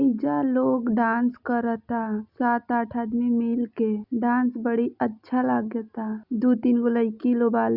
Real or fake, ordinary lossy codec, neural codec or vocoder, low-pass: real; Opus, 64 kbps; none; 5.4 kHz